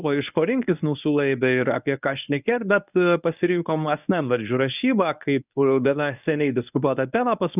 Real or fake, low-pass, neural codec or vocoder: fake; 3.6 kHz; codec, 24 kHz, 0.9 kbps, WavTokenizer, medium speech release version 1